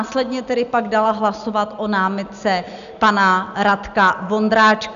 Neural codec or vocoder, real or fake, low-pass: none; real; 7.2 kHz